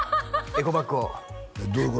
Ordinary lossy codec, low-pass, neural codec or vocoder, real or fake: none; none; none; real